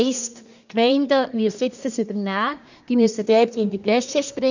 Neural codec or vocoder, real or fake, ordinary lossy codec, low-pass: codec, 24 kHz, 1 kbps, SNAC; fake; none; 7.2 kHz